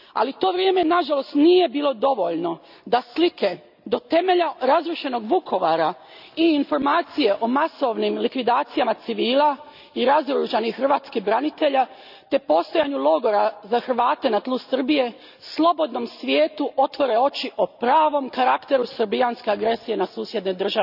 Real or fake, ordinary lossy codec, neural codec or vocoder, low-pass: real; none; none; 5.4 kHz